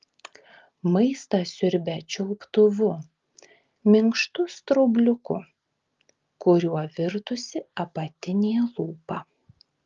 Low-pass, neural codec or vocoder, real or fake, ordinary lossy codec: 7.2 kHz; none; real; Opus, 32 kbps